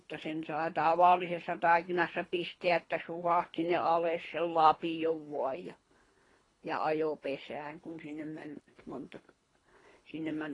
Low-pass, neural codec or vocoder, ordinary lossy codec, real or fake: 10.8 kHz; codec, 24 kHz, 3 kbps, HILCodec; AAC, 32 kbps; fake